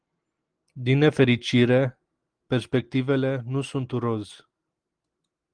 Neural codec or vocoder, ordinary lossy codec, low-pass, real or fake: none; Opus, 24 kbps; 9.9 kHz; real